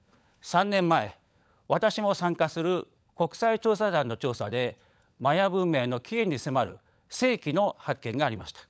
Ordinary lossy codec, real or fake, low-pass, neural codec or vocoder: none; fake; none; codec, 16 kHz, 16 kbps, FunCodec, trained on LibriTTS, 50 frames a second